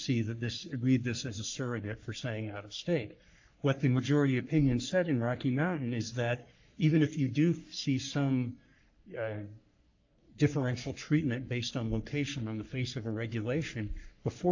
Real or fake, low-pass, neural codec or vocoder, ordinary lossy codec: fake; 7.2 kHz; codec, 44.1 kHz, 3.4 kbps, Pupu-Codec; AAC, 48 kbps